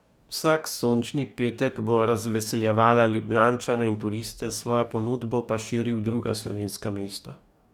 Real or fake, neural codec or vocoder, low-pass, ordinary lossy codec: fake; codec, 44.1 kHz, 2.6 kbps, DAC; 19.8 kHz; none